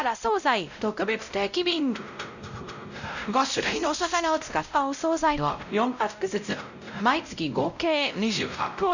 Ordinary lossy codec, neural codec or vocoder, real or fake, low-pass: none; codec, 16 kHz, 0.5 kbps, X-Codec, WavLM features, trained on Multilingual LibriSpeech; fake; 7.2 kHz